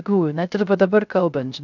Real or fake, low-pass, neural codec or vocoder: fake; 7.2 kHz; codec, 16 kHz, 0.3 kbps, FocalCodec